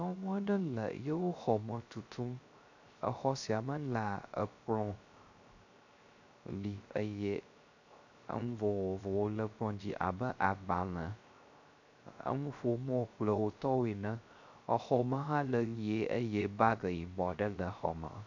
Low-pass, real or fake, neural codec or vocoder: 7.2 kHz; fake; codec, 16 kHz, 0.3 kbps, FocalCodec